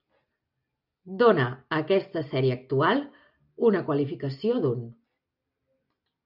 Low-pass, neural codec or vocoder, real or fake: 5.4 kHz; none; real